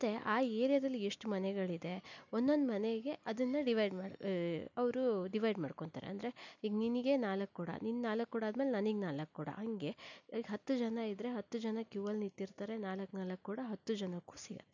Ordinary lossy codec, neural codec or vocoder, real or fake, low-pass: none; none; real; 7.2 kHz